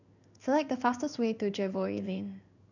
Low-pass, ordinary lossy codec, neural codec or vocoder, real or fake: 7.2 kHz; none; codec, 16 kHz in and 24 kHz out, 1 kbps, XY-Tokenizer; fake